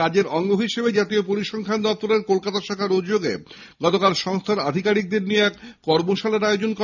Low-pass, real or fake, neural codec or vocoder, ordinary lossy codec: 7.2 kHz; real; none; none